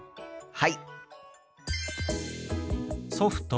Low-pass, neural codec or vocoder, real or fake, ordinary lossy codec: none; none; real; none